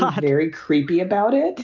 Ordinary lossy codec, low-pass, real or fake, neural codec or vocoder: Opus, 24 kbps; 7.2 kHz; real; none